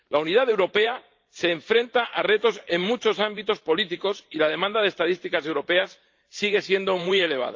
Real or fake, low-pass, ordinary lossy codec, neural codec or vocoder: fake; 7.2 kHz; Opus, 24 kbps; vocoder, 44.1 kHz, 128 mel bands every 512 samples, BigVGAN v2